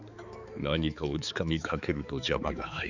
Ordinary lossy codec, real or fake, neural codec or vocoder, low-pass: none; fake; codec, 16 kHz, 4 kbps, X-Codec, HuBERT features, trained on balanced general audio; 7.2 kHz